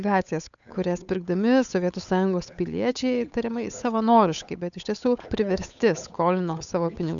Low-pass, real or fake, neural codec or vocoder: 7.2 kHz; fake; codec, 16 kHz, 8 kbps, FunCodec, trained on Chinese and English, 25 frames a second